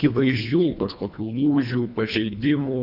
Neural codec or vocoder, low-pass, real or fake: codec, 24 kHz, 1.5 kbps, HILCodec; 5.4 kHz; fake